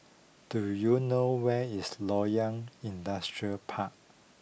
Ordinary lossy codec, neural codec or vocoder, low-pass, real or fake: none; none; none; real